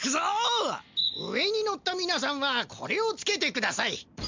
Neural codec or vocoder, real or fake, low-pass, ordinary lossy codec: none; real; 7.2 kHz; MP3, 64 kbps